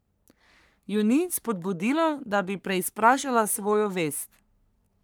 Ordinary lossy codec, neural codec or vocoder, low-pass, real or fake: none; codec, 44.1 kHz, 3.4 kbps, Pupu-Codec; none; fake